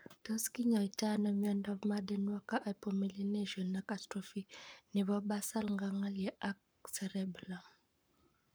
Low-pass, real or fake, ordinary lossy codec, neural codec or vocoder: none; fake; none; codec, 44.1 kHz, 7.8 kbps, Pupu-Codec